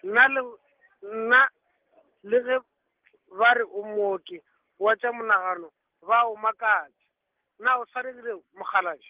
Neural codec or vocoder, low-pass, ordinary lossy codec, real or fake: none; 3.6 kHz; Opus, 16 kbps; real